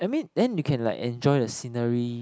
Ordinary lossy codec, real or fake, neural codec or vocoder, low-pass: none; real; none; none